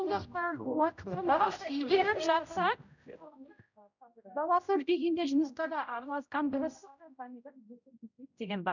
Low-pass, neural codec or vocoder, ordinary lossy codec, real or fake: 7.2 kHz; codec, 16 kHz, 0.5 kbps, X-Codec, HuBERT features, trained on general audio; none; fake